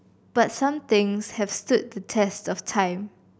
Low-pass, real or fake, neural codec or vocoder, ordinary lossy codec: none; real; none; none